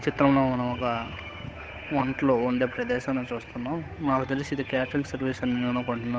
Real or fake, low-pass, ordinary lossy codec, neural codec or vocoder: fake; none; none; codec, 16 kHz, 8 kbps, FunCodec, trained on Chinese and English, 25 frames a second